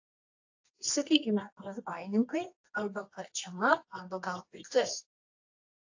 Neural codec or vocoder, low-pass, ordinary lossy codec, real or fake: codec, 24 kHz, 0.9 kbps, WavTokenizer, medium music audio release; 7.2 kHz; AAC, 48 kbps; fake